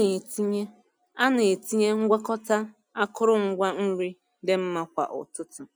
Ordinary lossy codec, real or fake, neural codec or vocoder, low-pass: none; real; none; none